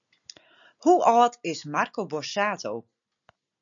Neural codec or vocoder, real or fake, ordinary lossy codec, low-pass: none; real; MP3, 96 kbps; 7.2 kHz